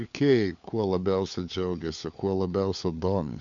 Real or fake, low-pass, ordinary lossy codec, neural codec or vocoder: fake; 7.2 kHz; MP3, 96 kbps; codec, 16 kHz, 4 kbps, FunCodec, trained on LibriTTS, 50 frames a second